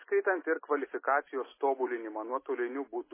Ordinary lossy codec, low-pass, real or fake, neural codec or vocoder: MP3, 16 kbps; 3.6 kHz; real; none